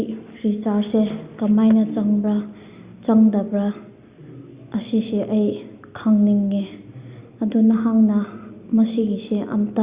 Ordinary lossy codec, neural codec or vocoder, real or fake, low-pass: Opus, 64 kbps; none; real; 3.6 kHz